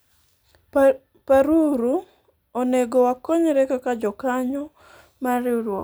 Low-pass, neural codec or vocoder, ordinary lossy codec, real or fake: none; none; none; real